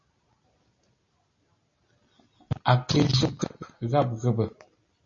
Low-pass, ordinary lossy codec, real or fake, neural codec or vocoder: 7.2 kHz; MP3, 32 kbps; real; none